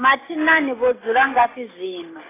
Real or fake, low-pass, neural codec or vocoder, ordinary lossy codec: real; 3.6 kHz; none; AAC, 16 kbps